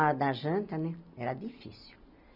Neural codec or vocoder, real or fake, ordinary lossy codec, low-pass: none; real; none; 5.4 kHz